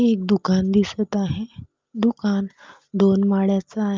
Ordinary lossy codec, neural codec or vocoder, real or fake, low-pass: Opus, 32 kbps; none; real; 7.2 kHz